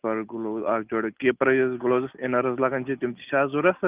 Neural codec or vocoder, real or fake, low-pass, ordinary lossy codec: none; real; 3.6 kHz; Opus, 32 kbps